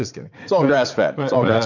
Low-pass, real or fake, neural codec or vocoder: 7.2 kHz; fake; vocoder, 44.1 kHz, 80 mel bands, Vocos